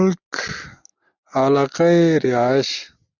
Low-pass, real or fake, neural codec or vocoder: 7.2 kHz; real; none